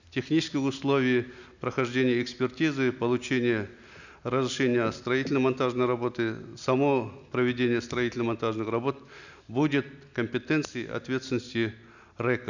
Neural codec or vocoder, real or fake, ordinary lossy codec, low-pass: none; real; none; 7.2 kHz